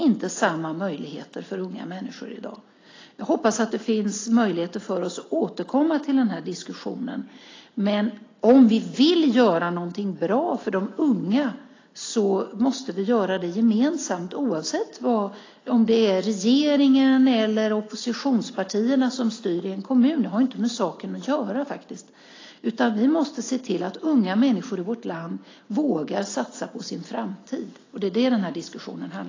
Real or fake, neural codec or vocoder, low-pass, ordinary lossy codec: real; none; 7.2 kHz; AAC, 32 kbps